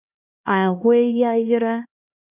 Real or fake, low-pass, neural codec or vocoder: fake; 3.6 kHz; codec, 16 kHz, 1 kbps, X-Codec, HuBERT features, trained on LibriSpeech